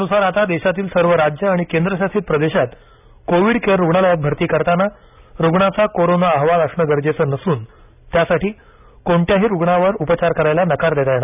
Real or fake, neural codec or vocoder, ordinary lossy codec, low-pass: real; none; none; 3.6 kHz